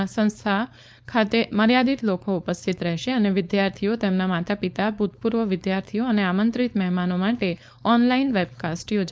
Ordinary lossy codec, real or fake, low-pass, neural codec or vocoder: none; fake; none; codec, 16 kHz, 4.8 kbps, FACodec